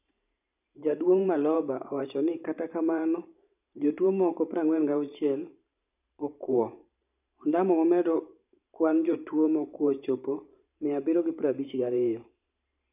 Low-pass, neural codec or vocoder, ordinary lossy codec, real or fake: 3.6 kHz; vocoder, 44.1 kHz, 128 mel bands every 512 samples, BigVGAN v2; none; fake